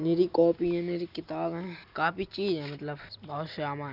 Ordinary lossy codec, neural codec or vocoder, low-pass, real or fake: none; none; 5.4 kHz; real